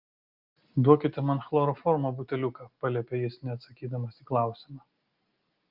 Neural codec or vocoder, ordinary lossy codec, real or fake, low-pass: none; Opus, 32 kbps; real; 5.4 kHz